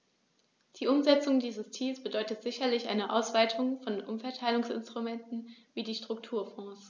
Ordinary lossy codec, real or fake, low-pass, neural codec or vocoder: none; real; none; none